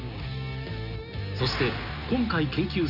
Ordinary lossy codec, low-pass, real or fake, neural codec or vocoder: none; 5.4 kHz; real; none